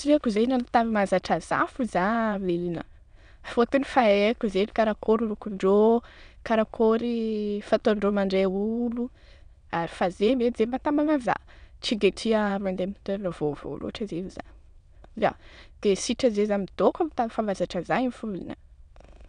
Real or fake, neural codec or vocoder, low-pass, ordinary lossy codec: fake; autoencoder, 22.05 kHz, a latent of 192 numbers a frame, VITS, trained on many speakers; 9.9 kHz; none